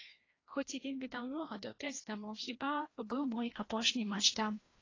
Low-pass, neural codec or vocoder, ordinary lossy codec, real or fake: 7.2 kHz; codec, 16 kHz, 1 kbps, FreqCodec, larger model; AAC, 32 kbps; fake